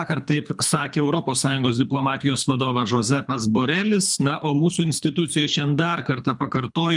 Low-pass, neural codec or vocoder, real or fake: 10.8 kHz; codec, 24 kHz, 3 kbps, HILCodec; fake